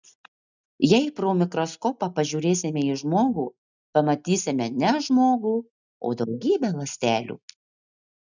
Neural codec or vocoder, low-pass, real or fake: none; 7.2 kHz; real